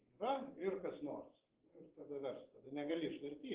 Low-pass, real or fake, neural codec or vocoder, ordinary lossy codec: 3.6 kHz; fake; vocoder, 24 kHz, 100 mel bands, Vocos; Opus, 16 kbps